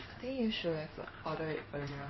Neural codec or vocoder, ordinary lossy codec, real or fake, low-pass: codec, 16 kHz in and 24 kHz out, 1 kbps, XY-Tokenizer; MP3, 24 kbps; fake; 7.2 kHz